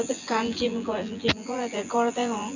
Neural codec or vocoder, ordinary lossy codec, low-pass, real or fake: vocoder, 24 kHz, 100 mel bands, Vocos; none; 7.2 kHz; fake